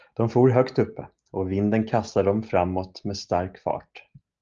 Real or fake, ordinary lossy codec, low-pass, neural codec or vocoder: real; Opus, 24 kbps; 7.2 kHz; none